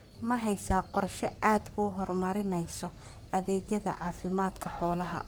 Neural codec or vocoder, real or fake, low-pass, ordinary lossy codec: codec, 44.1 kHz, 3.4 kbps, Pupu-Codec; fake; none; none